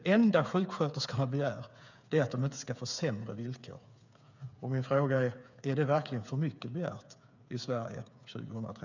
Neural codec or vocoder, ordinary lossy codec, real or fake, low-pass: codec, 16 kHz, 8 kbps, FreqCodec, smaller model; none; fake; 7.2 kHz